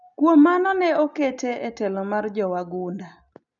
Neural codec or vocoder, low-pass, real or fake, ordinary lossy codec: none; 7.2 kHz; real; none